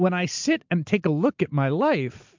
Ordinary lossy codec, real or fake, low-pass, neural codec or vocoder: MP3, 64 kbps; real; 7.2 kHz; none